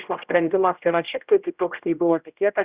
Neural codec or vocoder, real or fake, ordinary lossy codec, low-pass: codec, 16 kHz, 0.5 kbps, X-Codec, HuBERT features, trained on general audio; fake; Opus, 64 kbps; 3.6 kHz